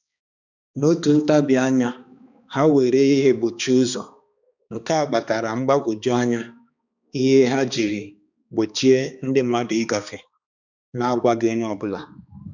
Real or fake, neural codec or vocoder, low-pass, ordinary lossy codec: fake; codec, 16 kHz, 2 kbps, X-Codec, HuBERT features, trained on balanced general audio; 7.2 kHz; none